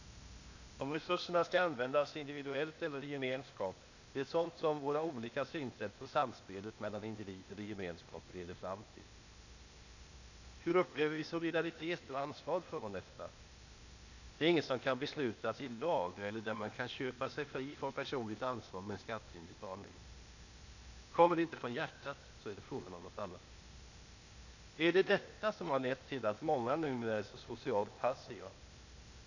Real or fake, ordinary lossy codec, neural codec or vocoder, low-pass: fake; none; codec, 16 kHz, 0.8 kbps, ZipCodec; 7.2 kHz